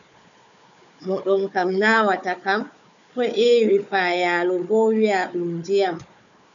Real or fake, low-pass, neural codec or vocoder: fake; 7.2 kHz; codec, 16 kHz, 4 kbps, FunCodec, trained on Chinese and English, 50 frames a second